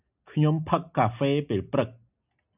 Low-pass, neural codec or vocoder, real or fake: 3.6 kHz; none; real